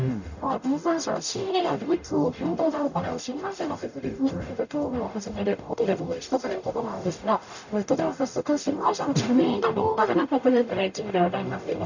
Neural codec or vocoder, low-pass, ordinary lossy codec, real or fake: codec, 44.1 kHz, 0.9 kbps, DAC; 7.2 kHz; none; fake